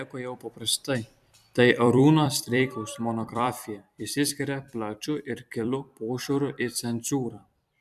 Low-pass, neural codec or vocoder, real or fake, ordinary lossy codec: 14.4 kHz; vocoder, 44.1 kHz, 128 mel bands every 256 samples, BigVGAN v2; fake; MP3, 96 kbps